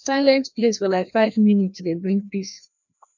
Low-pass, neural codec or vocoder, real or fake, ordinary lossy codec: 7.2 kHz; codec, 16 kHz, 1 kbps, FreqCodec, larger model; fake; none